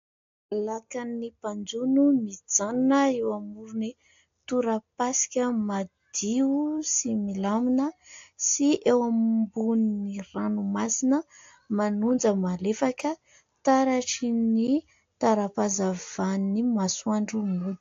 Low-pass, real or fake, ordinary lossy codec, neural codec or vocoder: 7.2 kHz; real; AAC, 48 kbps; none